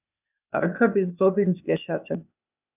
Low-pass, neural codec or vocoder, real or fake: 3.6 kHz; codec, 16 kHz, 0.8 kbps, ZipCodec; fake